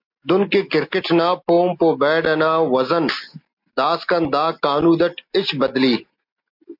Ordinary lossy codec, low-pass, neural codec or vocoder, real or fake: MP3, 48 kbps; 5.4 kHz; none; real